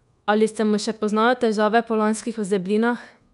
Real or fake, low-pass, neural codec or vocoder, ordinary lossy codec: fake; 10.8 kHz; codec, 24 kHz, 1.2 kbps, DualCodec; none